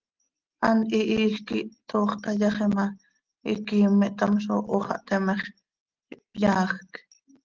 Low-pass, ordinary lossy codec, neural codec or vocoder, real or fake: 7.2 kHz; Opus, 16 kbps; none; real